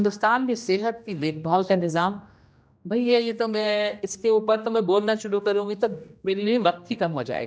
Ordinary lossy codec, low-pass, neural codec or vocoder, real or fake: none; none; codec, 16 kHz, 1 kbps, X-Codec, HuBERT features, trained on general audio; fake